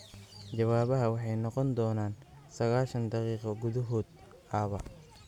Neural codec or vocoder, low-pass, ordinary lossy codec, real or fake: none; 19.8 kHz; none; real